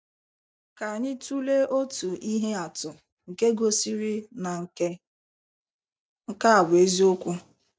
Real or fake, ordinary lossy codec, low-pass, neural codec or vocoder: real; none; none; none